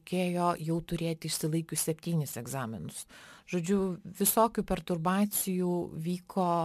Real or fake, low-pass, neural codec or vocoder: real; 14.4 kHz; none